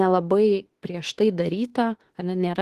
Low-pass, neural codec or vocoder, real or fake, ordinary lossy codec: 14.4 kHz; autoencoder, 48 kHz, 32 numbers a frame, DAC-VAE, trained on Japanese speech; fake; Opus, 16 kbps